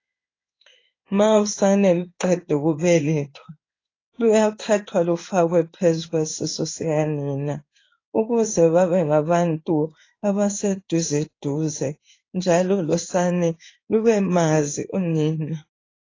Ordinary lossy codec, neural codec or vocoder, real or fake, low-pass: AAC, 32 kbps; codec, 16 kHz in and 24 kHz out, 1 kbps, XY-Tokenizer; fake; 7.2 kHz